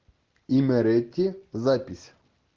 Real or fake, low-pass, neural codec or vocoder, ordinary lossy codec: real; 7.2 kHz; none; Opus, 16 kbps